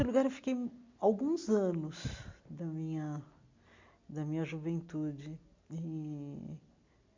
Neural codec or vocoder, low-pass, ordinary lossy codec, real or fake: none; 7.2 kHz; MP3, 48 kbps; real